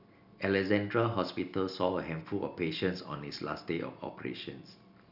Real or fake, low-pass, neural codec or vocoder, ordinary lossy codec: real; 5.4 kHz; none; none